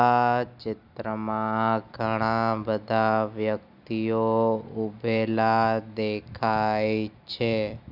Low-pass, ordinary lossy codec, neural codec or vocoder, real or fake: 5.4 kHz; none; none; real